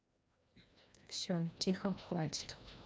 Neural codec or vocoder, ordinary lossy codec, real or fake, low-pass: codec, 16 kHz, 1 kbps, FreqCodec, larger model; none; fake; none